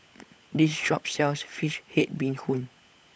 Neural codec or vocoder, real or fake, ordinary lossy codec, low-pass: codec, 16 kHz, 16 kbps, FunCodec, trained on LibriTTS, 50 frames a second; fake; none; none